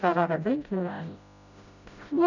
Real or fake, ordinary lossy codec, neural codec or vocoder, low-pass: fake; none; codec, 16 kHz, 0.5 kbps, FreqCodec, smaller model; 7.2 kHz